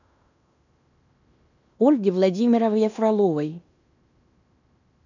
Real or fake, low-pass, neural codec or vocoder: fake; 7.2 kHz; codec, 16 kHz in and 24 kHz out, 0.9 kbps, LongCat-Audio-Codec, fine tuned four codebook decoder